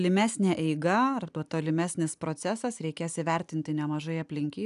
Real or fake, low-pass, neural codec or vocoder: real; 10.8 kHz; none